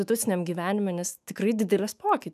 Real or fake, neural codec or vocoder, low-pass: fake; autoencoder, 48 kHz, 128 numbers a frame, DAC-VAE, trained on Japanese speech; 14.4 kHz